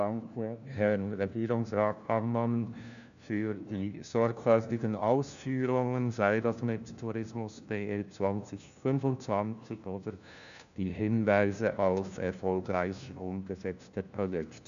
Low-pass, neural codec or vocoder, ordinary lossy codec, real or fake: 7.2 kHz; codec, 16 kHz, 1 kbps, FunCodec, trained on LibriTTS, 50 frames a second; none; fake